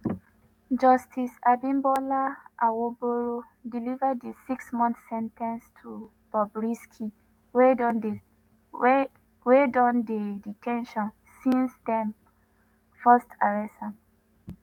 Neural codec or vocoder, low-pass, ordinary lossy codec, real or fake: codec, 44.1 kHz, 7.8 kbps, DAC; 19.8 kHz; MP3, 96 kbps; fake